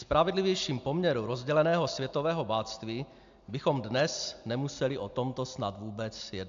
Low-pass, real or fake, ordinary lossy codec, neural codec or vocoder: 7.2 kHz; real; AAC, 64 kbps; none